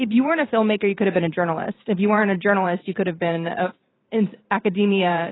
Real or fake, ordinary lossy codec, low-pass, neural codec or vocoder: fake; AAC, 16 kbps; 7.2 kHz; vocoder, 44.1 kHz, 128 mel bands every 512 samples, BigVGAN v2